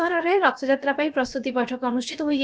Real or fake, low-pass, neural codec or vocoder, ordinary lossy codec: fake; none; codec, 16 kHz, about 1 kbps, DyCAST, with the encoder's durations; none